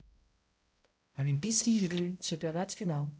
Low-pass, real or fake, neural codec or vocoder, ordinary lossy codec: none; fake; codec, 16 kHz, 0.5 kbps, X-Codec, HuBERT features, trained on balanced general audio; none